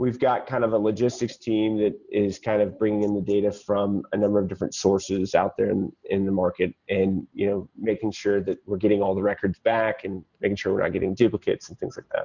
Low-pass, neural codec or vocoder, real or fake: 7.2 kHz; none; real